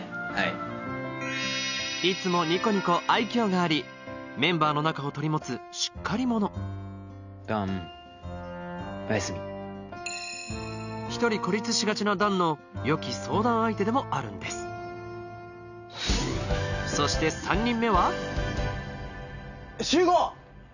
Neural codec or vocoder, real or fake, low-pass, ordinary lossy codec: none; real; 7.2 kHz; none